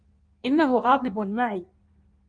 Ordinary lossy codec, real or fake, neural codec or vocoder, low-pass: Opus, 32 kbps; fake; codec, 16 kHz in and 24 kHz out, 1.1 kbps, FireRedTTS-2 codec; 9.9 kHz